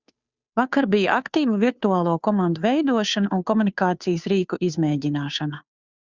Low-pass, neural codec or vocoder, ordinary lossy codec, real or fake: 7.2 kHz; codec, 16 kHz, 2 kbps, FunCodec, trained on Chinese and English, 25 frames a second; Opus, 64 kbps; fake